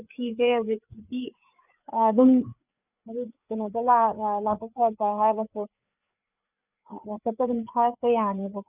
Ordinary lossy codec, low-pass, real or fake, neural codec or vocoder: none; 3.6 kHz; fake; codec, 16 kHz, 4 kbps, FreqCodec, larger model